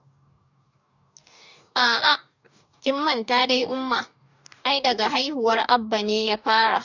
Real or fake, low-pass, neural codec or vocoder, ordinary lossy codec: fake; 7.2 kHz; codec, 44.1 kHz, 2.6 kbps, DAC; none